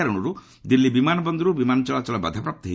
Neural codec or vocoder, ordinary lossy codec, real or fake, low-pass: none; none; real; none